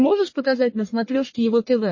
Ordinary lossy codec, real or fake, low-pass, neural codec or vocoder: MP3, 32 kbps; fake; 7.2 kHz; codec, 44.1 kHz, 1.7 kbps, Pupu-Codec